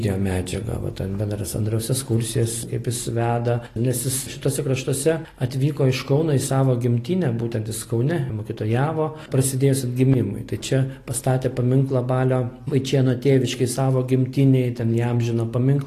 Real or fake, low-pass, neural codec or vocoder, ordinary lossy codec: real; 14.4 kHz; none; AAC, 48 kbps